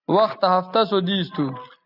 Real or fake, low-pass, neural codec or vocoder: real; 5.4 kHz; none